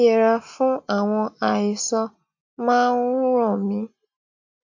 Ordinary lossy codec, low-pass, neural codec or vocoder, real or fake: AAC, 48 kbps; 7.2 kHz; none; real